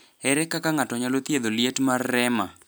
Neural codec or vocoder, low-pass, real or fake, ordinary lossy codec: none; none; real; none